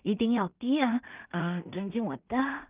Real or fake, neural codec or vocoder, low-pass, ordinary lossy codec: fake; codec, 16 kHz in and 24 kHz out, 0.4 kbps, LongCat-Audio-Codec, two codebook decoder; 3.6 kHz; Opus, 24 kbps